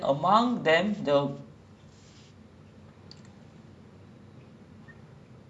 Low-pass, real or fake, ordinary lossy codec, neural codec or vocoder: none; real; none; none